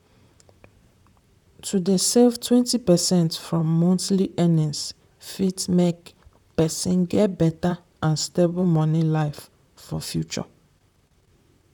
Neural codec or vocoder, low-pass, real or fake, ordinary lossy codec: vocoder, 44.1 kHz, 128 mel bands, Pupu-Vocoder; 19.8 kHz; fake; none